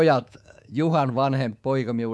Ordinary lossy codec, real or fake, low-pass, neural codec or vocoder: none; fake; none; codec, 24 kHz, 3.1 kbps, DualCodec